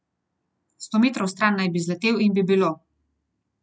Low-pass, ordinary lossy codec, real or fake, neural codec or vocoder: none; none; real; none